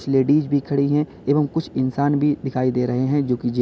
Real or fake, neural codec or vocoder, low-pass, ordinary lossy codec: real; none; none; none